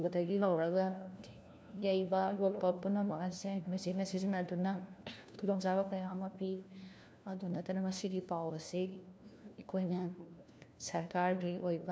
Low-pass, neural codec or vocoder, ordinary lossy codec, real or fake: none; codec, 16 kHz, 1 kbps, FunCodec, trained on LibriTTS, 50 frames a second; none; fake